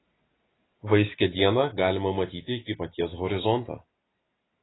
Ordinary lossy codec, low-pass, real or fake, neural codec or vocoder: AAC, 16 kbps; 7.2 kHz; real; none